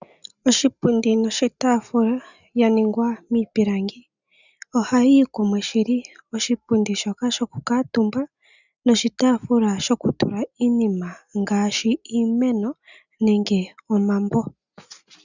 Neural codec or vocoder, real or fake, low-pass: none; real; 7.2 kHz